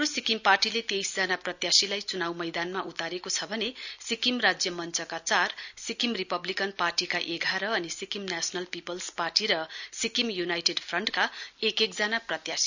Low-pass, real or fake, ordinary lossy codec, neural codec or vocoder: 7.2 kHz; real; none; none